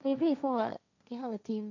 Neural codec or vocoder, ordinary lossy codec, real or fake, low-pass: codec, 16 kHz, 1.1 kbps, Voila-Tokenizer; none; fake; 7.2 kHz